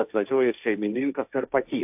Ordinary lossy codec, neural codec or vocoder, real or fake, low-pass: AAC, 32 kbps; codec, 16 kHz, 1.1 kbps, Voila-Tokenizer; fake; 3.6 kHz